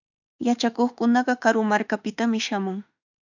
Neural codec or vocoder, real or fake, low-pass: autoencoder, 48 kHz, 32 numbers a frame, DAC-VAE, trained on Japanese speech; fake; 7.2 kHz